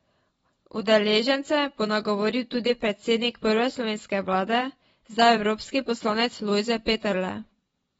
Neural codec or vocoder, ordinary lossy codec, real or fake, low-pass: vocoder, 24 kHz, 100 mel bands, Vocos; AAC, 24 kbps; fake; 10.8 kHz